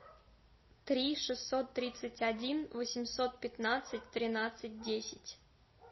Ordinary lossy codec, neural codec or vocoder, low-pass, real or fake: MP3, 24 kbps; none; 7.2 kHz; real